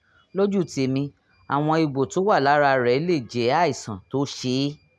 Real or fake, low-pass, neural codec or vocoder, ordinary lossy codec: real; none; none; none